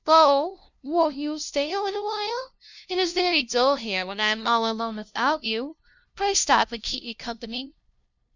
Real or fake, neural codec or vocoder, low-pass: fake; codec, 16 kHz, 0.5 kbps, FunCodec, trained on LibriTTS, 25 frames a second; 7.2 kHz